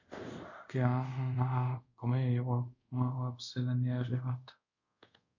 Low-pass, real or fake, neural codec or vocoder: 7.2 kHz; fake; codec, 24 kHz, 0.5 kbps, DualCodec